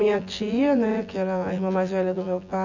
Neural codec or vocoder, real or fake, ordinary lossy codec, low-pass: vocoder, 24 kHz, 100 mel bands, Vocos; fake; none; 7.2 kHz